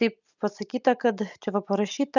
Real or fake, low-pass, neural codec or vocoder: real; 7.2 kHz; none